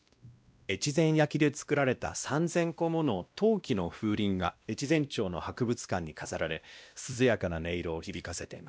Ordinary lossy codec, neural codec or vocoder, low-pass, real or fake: none; codec, 16 kHz, 1 kbps, X-Codec, WavLM features, trained on Multilingual LibriSpeech; none; fake